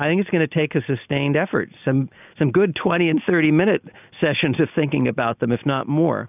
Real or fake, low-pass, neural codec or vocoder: real; 3.6 kHz; none